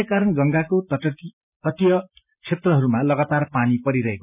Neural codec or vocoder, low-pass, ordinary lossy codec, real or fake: none; 3.6 kHz; none; real